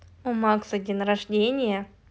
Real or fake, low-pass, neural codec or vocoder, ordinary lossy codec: real; none; none; none